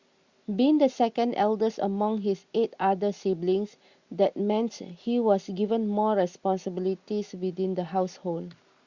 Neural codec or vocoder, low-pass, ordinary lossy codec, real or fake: none; 7.2 kHz; Opus, 64 kbps; real